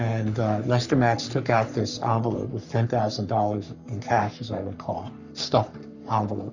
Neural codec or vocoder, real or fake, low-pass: codec, 44.1 kHz, 3.4 kbps, Pupu-Codec; fake; 7.2 kHz